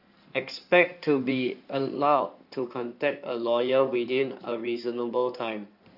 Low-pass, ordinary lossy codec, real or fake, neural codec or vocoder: 5.4 kHz; none; fake; codec, 16 kHz in and 24 kHz out, 2.2 kbps, FireRedTTS-2 codec